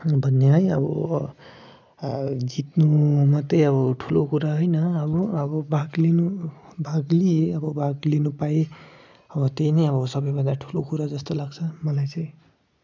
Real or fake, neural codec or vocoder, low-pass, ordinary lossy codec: real; none; none; none